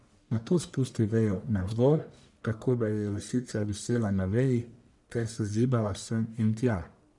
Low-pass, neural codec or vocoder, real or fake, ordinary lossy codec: 10.8 kHz; codec, 44.1 kHz, 1.7 kbps, Pupu-Codec; fake; none